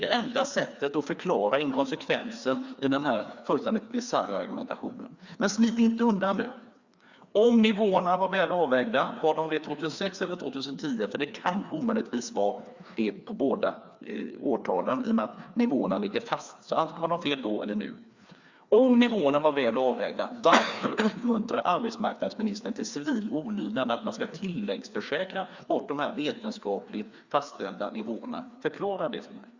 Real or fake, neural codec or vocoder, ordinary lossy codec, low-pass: fake; codec, 16 kHz, 2 kbps, FreqCodec, larger model; Opus, 64 kbps; 7.2 kHz